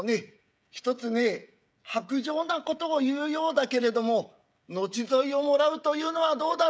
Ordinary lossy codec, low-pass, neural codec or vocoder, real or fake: none; none; codec, 16 kHz, 16 kbps, FreqCodec, smaller model; fake